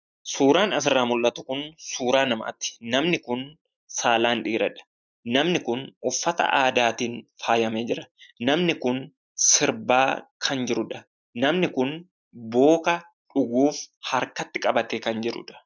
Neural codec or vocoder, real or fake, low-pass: none; real; 7.2 kHz